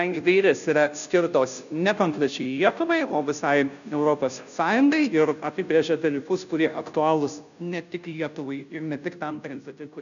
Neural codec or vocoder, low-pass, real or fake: codec, 16 kHz, 0.5 kbps, FunCodec, trained on Chinese and English, 25 frames a second; 7.2 kHz; fake